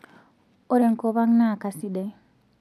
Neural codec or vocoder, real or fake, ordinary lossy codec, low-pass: none; real; none; 14.4 kHz